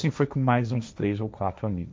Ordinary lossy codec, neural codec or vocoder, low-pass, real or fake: none; codec, 16 kHz, 1.1 kbps, Voila-Tokenizer; none; fake